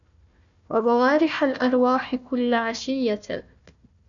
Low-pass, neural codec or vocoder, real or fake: 7.2 kHz; codec, 16 kHz, 1 kbps, FunCodec, trained on Chinese and English, 50 frames a second; fake